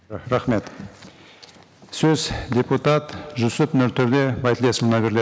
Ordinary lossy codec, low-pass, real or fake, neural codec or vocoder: none; none; real; none